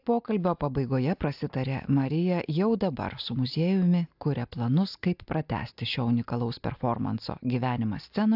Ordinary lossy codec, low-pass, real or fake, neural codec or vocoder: AAC, 48 kbps; 5.4 kHz; real; none